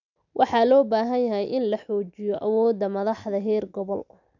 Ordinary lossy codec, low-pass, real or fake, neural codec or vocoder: none; 7.2 kHz; real; none